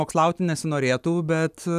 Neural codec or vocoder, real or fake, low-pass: none; real; 14.4 kHz